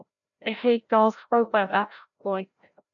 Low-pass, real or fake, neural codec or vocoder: 7.2 kHz; fake; codec, 16 kHz, 0.5 kbps, FreqCodec, larger model